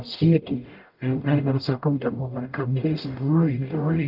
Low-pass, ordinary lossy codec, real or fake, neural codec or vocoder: 5.4 kHz; Opus, 24 kbps; fake; codec, 44.1 kHz, 0.9 kbps, DAC